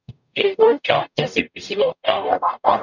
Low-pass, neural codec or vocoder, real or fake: 7.2 kHz; codec, 44.1 kHz, 0.9 kbps, DAC; fake